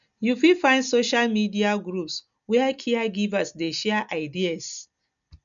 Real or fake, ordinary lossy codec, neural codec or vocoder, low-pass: real; none; none; 7.2 kHz